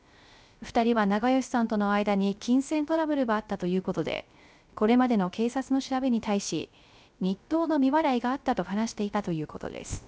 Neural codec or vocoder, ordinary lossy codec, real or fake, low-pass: codec, 16 kHz, 0.3 kbps, FocalCodec; none; fake; none